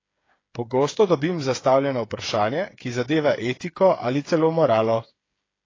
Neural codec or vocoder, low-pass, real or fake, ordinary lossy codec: codec, 16 kHz, 8 kbps, FreqCodec, smaller model; 7.2 kHz; fake; AAC, 32 kbps